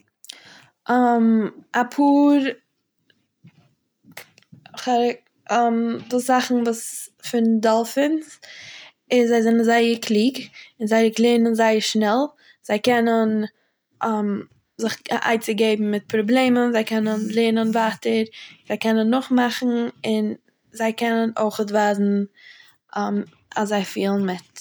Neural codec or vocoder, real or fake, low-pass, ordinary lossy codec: none; real; none; none